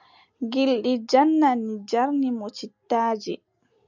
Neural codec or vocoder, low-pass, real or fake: none; 7.2 kHz; real